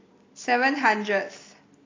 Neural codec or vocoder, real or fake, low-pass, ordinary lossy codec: none; real; 7.2 kHz; AAC, 32 kbps